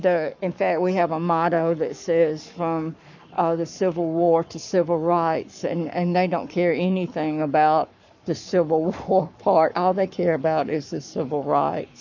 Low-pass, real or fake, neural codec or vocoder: 7.2 kHz; fake; codec, 44.1 kHz, 7.8 kbps, Pupu-Codec